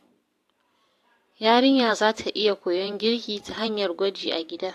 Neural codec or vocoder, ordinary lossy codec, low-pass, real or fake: vocoder, 44.1 kHz, 128 mel bands every 512 samples, BigVGAN v2; AAC, 64 kbps; 14.4 kHz; fake